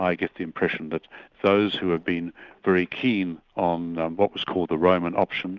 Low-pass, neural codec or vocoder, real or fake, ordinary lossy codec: 7.2 kHz; none; real; Opus, 24 kbps